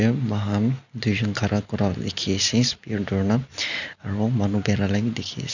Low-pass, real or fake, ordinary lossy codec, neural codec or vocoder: 7.2 kHz; real; none; none